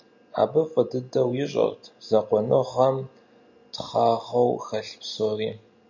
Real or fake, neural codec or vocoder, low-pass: real; none; 7.2 kHz